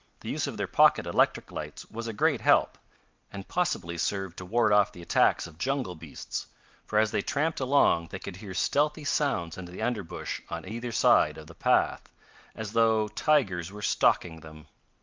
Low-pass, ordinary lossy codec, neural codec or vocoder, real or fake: 7.2 kHz; Opus, 32 kbps; none; real